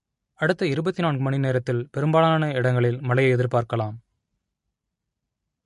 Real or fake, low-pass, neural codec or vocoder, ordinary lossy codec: real; 14.4 kHz; none; MP3, 48 kbps